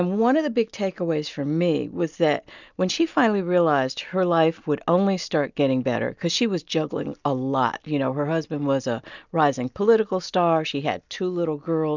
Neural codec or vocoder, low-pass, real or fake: none; 7.2 kHz; real